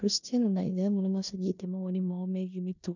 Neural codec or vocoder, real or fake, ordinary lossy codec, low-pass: codec, 16 kHz in and 24 kHz out, 0.9 kbps, LongCat-Audio-Codec, four codebook decoder; fake; none; 7.2 kHz